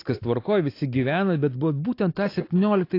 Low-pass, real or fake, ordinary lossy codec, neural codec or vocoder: 5.4 kHz; real; AAC, 32 kbps; none